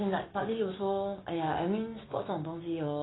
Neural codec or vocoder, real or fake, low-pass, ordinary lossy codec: codec, 16 kHz, 6 kbps, DAC; fake; 7.2 kHz; AAC, 16 kbps